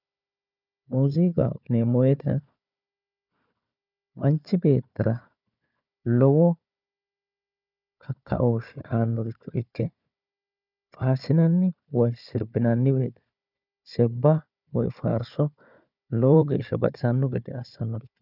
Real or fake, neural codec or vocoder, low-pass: fake; codec, 16 kHz, 4 kbps, FunCodec, trained on Chinese and English, 50 frames a second; 5.4 kHz